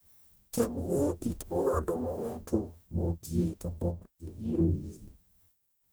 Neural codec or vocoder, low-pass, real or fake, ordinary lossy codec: codec, 44.1 kHz, 0.9 kbps, DAC; none; fake; none